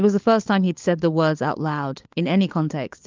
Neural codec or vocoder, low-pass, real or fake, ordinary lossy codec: codec, 16 kHz, 4 kbps, FunCodec, trained on LibriTTS, 50 frames a second; 7.2 kHz; fake; Opus, 32 kbps